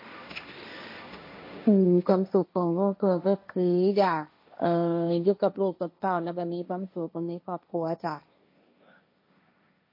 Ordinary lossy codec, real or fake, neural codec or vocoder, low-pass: MP3, 32 kbps; fake; codec, 16 kHz, 1.1 kbps, Voila-Tokenizer; 5.4 kHz